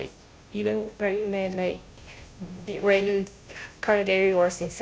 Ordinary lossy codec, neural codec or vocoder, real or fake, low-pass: none; codec, 16 kHz, 0.5 kbps, FunCodec, trained on Chinese and English, 25 frames a second; fake; none